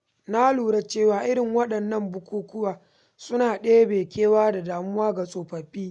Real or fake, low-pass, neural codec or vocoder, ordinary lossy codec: real; 10.8 kHz; none; none